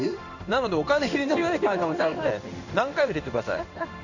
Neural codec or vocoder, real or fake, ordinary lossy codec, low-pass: codec, 16 kHz in and 24 kHz out, 1 kbps, XY-Tokenizer; fake; none; 7.2 kHz